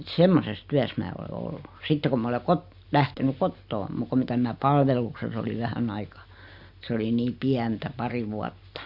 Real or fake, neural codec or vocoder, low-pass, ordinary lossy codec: real; none; 5.4 kHz; Opus, 64 kbps